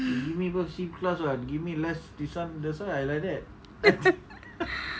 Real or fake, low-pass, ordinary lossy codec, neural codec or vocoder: real; none; none; none